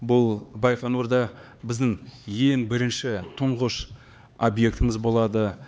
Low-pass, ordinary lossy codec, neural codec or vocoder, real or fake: none; none; codec, 16 kHz, 4 kbps, X-Codec, HuBERT features, trained on LibriSpeech; fake